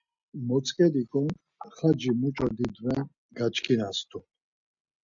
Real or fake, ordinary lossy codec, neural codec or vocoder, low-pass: real; MP3, 96 kbps; none; 7.2 kHz